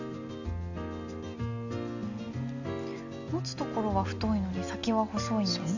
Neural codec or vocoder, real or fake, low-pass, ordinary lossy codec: none; real; 7.2 kHz; none